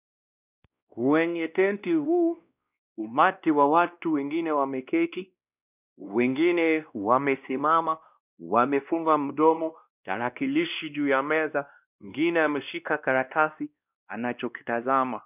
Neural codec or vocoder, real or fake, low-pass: codec, 16 kHz, 1 kbps, X-Codec, WavLM features, trained on Multilingual LibriSpeech; fake; 3.6 kHz